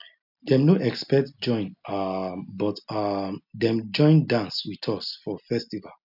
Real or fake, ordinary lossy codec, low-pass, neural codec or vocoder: real; none; 5.4 kHz; none